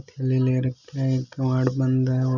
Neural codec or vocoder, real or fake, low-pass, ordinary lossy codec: none; real; 7.2 kHz; none